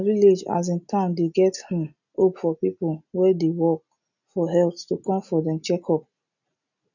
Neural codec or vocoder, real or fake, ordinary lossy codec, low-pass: none; real; none; 7.2 kHz